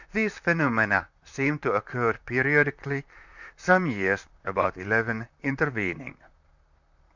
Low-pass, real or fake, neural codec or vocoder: 7.2 kHz; fake; vocoder, 44.1 kHz, 128 mel bands, Pupu-Vocoder